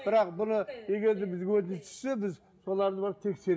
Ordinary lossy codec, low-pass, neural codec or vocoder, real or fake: none; none; none; real